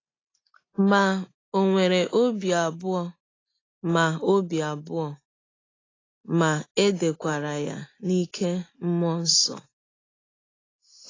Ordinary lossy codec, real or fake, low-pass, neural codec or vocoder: AAC, 32 kbps; real; 7.2 kHz; none